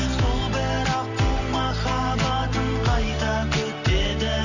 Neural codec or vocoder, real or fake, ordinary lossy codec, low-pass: none; real; none; 7.2 kHz